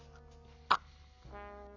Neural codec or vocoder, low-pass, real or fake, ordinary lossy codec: none; 7.2 kHz; real; none